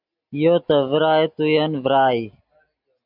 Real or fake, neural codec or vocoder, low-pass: real; none; 5.4 kHz